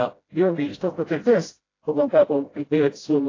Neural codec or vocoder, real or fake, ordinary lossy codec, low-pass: codec, 16 kHz, 0.5 kbps, FreqCodec, smaller model; fake; AAC, 32 kbps; 7.2 kHz